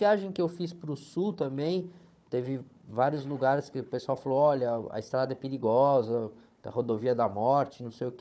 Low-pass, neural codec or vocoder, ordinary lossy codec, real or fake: none; codec, 16 kHz, 4 kbps, FunCodec, trained on Chinese and English, 50 frames a second; none; fake